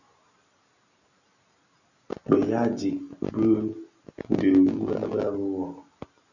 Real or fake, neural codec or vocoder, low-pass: real; none; 7.2 kHz